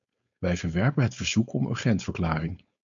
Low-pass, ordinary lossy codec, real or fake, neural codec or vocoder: 7.2 kHz; MP3, 64 kbps; fake; codec, 16 kHz, 4.8 kbps, FACodec